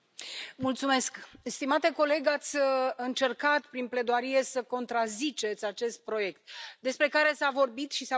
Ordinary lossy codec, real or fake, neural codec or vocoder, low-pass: none; real; none; none